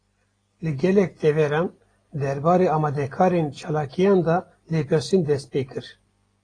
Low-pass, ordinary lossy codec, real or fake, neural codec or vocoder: 9.9 kHz; AAC, 32 kbps; real; none